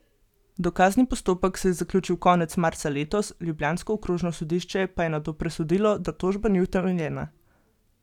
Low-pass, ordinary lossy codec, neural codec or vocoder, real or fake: 19.8 kHz; none; none; real